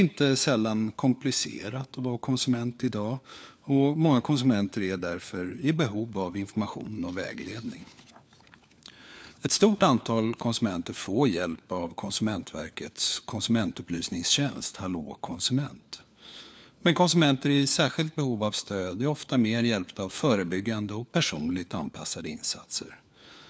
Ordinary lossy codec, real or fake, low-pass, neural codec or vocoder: none; fake; none; codec, 16 kHz, 4 kbps, FunCodec, trained on LibriTTS, 50 frames a second